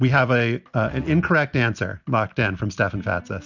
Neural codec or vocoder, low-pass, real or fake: none; 7.2 kHz; real